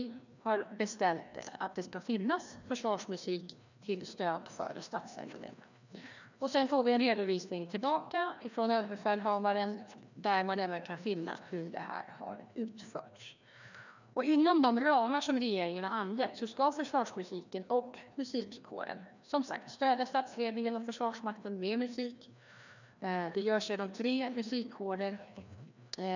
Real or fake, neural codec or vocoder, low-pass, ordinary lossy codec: fake; codec, 16 kHz, 1 kbps, FreqCodec, larger model; 7.2 kHz; none